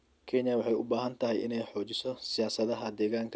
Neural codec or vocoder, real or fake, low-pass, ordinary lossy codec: none; real; none; none